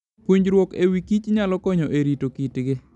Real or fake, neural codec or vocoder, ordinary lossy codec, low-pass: real; none; none; 10.8 kHz